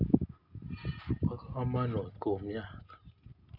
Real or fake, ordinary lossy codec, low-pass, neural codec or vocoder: real; none; 5.4 kHz; none